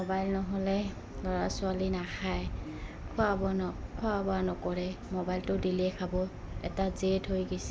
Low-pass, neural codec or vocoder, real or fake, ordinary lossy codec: none; none; real; none